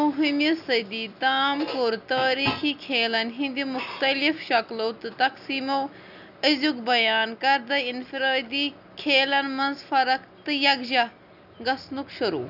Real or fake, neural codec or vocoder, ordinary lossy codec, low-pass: real; none; none; 5.4 kHz